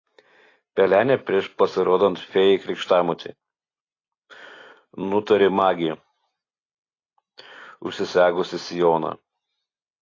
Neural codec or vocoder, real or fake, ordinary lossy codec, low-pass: none; real; AAC, 32 kbps; 7.2 kHz